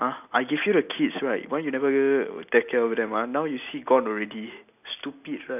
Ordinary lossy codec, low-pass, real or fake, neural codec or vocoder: none; 3.6 kHz; real; none